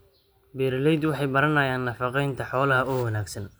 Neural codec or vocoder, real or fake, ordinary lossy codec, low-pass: none; real; none; none